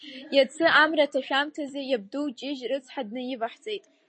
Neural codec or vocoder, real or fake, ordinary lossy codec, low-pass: none; real; MP3, 32 kbps; 9.9 kHz